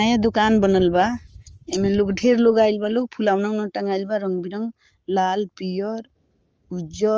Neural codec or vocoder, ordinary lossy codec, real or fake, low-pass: codec, 44.1 kHz, 7.8 kbps, Pupu-Codec; Opus, 24 kbps; fake; 7.2 kHz